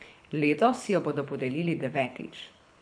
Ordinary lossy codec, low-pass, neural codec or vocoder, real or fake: none; 9.9 kHz; codec, 24 kHz, 6 kbps, HILCodec; fake